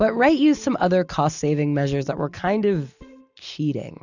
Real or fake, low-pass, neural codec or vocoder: real; 7.2 kHz; none